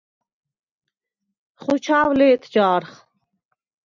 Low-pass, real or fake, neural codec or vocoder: 7.2 kHz; real; none